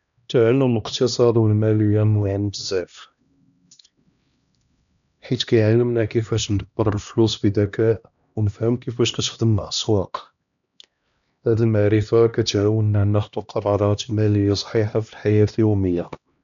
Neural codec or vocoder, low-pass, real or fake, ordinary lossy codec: codec, 16 kHz, 1 kbps, X-Codec, HuBERT features, trained on LibriSpeech; 7.2 kHz; fake; none